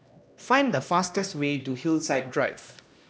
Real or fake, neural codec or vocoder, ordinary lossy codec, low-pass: fake; codec, 16 kHz, 1 kbps, X-Codec, HuBERT features, trained on LibriSpeech; none; none